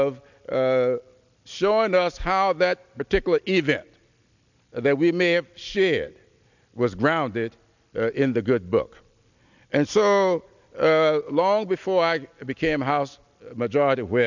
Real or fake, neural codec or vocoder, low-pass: real; none; 7.2 kHz